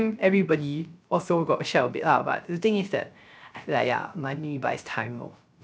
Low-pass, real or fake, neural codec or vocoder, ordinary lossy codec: none; fake; codec, 16 kHz, 0.3 kbps, FocalCodec; none